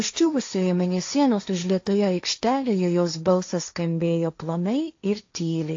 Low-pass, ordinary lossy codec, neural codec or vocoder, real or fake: 7.2 kHz; AAC, 48 kbps; codec, 16 kHz, 1.1 kbps, Voila-Tokenizer; fake